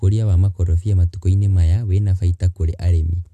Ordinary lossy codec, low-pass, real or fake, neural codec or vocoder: AAC, 64 kbps; 14.4 kHz; real; none